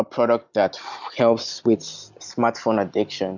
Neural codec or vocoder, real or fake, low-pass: none; real; 7.2 kHz